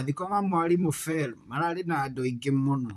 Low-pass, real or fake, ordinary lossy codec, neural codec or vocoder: 14.4 kHz; fake; none; vocoder, 44.1 kHz, 128 mel bands, Pupu-Vocoder